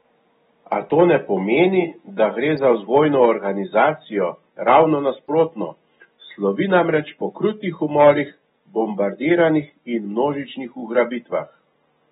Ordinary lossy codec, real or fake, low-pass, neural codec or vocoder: AAC, 16 kbps; real; 9.9 kHz; none